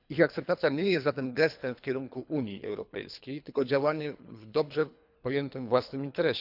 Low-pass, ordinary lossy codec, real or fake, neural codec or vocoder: 5.4 kHz; none; fake; codec, 24 kHz, 3 kbps, HILCodec